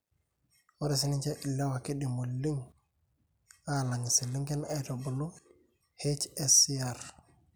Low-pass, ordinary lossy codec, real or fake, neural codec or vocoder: none; none; real; none